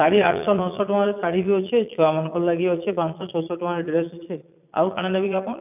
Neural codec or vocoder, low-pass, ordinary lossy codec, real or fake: vocoder, 22.05 kHz, 80 mel bands, Vocos; 3.6 kHz; none; fake